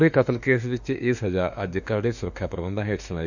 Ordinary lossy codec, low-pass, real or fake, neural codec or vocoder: none; 7.2 kHz; fake; autoencoder, 48 kHz, 32 numbers a frame, DAC-VAE, trained on Japanese speech